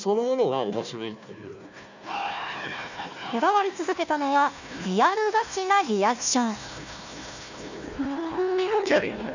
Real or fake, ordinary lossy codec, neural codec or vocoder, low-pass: fake; none; codec, 16 kHz, 1 kbps, FunCodec, trained on Chinese and English, 50 frames a second; 7.2 kHz